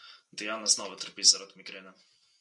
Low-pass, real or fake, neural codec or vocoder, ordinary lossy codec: 10.8 kHz; real; none; MP3, 96 kbps